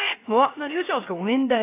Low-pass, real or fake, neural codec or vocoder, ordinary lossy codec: 3.6 kHz; fake; codec, 16 kHz, 0.3 kbps, FocalCodec; AAC, 24 kbps